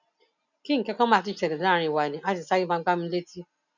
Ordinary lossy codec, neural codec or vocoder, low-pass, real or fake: none; none; 7.2 kHz; real